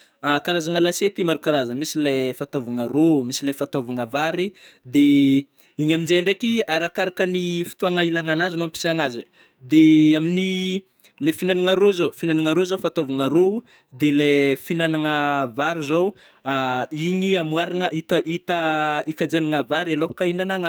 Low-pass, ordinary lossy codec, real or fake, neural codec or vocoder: none; none; fake; codec, 44.1 kHz, 2.6 kbps, SNAC